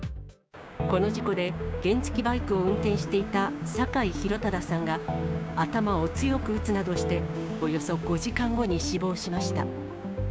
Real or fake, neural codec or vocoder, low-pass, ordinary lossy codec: fake; codec, 16 kHz, 6 kbps, DAC; none; none